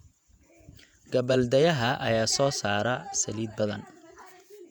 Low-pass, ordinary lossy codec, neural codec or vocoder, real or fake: 19.8 kHz; none; vocoder, 48 kHz, 128 mel bands, Vocos; fake